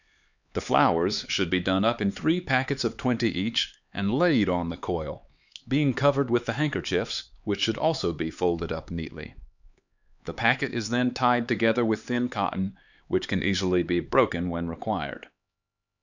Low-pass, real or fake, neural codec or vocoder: 7.2 kHz; fake; codec, 16 kHz, 4 kbps, X-Codec, HuBERT features, trained on LibriSpeech